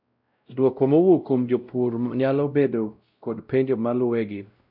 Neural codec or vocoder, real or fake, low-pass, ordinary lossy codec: codec, 16 kHz, 0.5 kbps, X-Codec, WavLM features, trained on Multilingual LibriSpeech; fake; 5.4 kHz; none